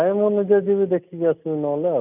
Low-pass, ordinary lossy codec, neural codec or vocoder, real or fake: 3.6 kHz; none; none; real